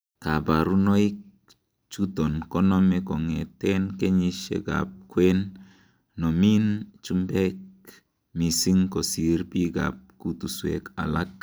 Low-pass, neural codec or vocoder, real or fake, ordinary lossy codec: none; none; real; none